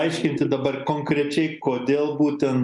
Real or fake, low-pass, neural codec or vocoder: real; 10.8 kHz; none